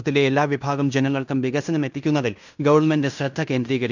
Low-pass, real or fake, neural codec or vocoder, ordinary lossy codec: 7.2 kHz; fake; codec, 16 kHz in and 24 kHz out, 0.9 kbps, LongCat-Audio-Codec, fine tuned four codebook decoder; none